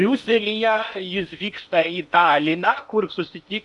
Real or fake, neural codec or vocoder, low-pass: fake; codec, 16 kHz in and 24 kHz out, 0.8 kbps, FocalCodec, streaming, 65536 codes; 10.8 kHz